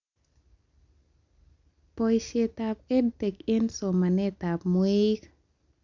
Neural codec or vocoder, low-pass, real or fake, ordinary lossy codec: none; 7.2 kHz; real; none